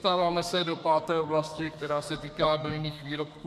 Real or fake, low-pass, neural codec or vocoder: fake; 14.4 kHz; codec, 32 kHz, 1.9 kbps, SNAC